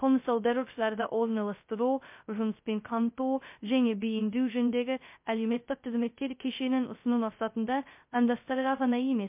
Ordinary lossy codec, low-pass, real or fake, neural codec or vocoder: MP3, 32 kbps; 3.6 kHz; fake; codec, 16 kHz, 0.2 kbps, FocalCodec